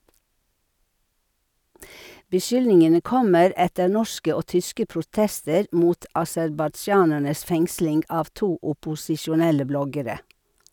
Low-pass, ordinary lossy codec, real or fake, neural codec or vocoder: 19.8 kHz; none; real; none